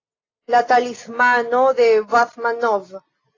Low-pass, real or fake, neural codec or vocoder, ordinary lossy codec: 7.2 kHz; real; none; AAC, 32 kbps